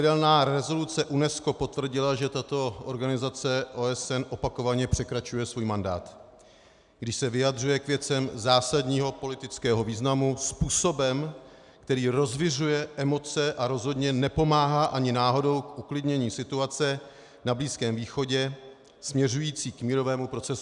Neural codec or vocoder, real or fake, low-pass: none; real; 10.8 kHz